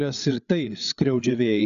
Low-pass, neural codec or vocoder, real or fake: 7.2 kHz; codec, 16 kHz, 8 kbps, FreqCodec, larger model; fake